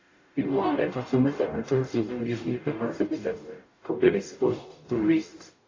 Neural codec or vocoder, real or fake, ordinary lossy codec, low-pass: codec, 44.1 kHz, 0.9 kbps, DAC; fake; AAC, 32 kbps; 7.2 kHz